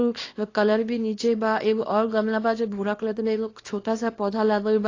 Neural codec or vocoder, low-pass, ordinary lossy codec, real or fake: codec, 24 kHz, 0.9 kbps, WavTokenizer, small release; 7.2 kHz; AAC, 32 kbps; fake